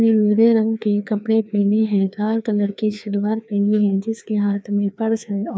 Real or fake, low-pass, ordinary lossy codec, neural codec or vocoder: fake; none; none; codec, 16 kHz, 2 kbps, FreqCodec, larger model